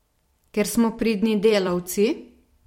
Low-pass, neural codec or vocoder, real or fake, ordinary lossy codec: 19.8 kHz; vocoder, 44.1 kHz, 128 mel bands every 256 samples, BigVGAN v2; fake; MP3, 64 kbps